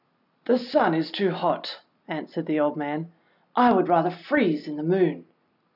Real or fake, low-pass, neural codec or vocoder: real; 5.4 kHz; none